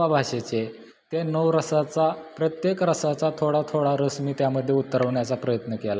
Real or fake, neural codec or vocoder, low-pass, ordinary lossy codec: real; none; none; none